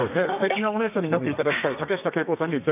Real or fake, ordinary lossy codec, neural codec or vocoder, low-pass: fake; none; codec, 32 kHz, 1.9 kbps, SNAC; 3.6 kHz